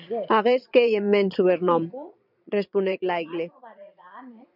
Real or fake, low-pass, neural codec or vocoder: fake; 5.4 kHz; vocoder, 22.05 kHz, 80 mel bands, Vocos